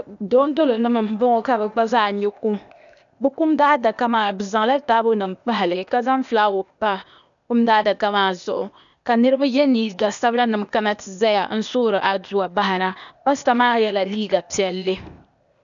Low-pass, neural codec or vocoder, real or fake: 7.2 kHz; codec, 16 kHz, 0.8 kbps, ZipCodec; fake